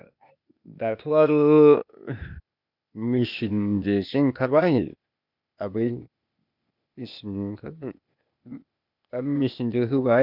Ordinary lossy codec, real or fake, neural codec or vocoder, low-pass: none; fake; codec, 16 kHz, 0.8 kbps, ZipCodec; 5.4 kHz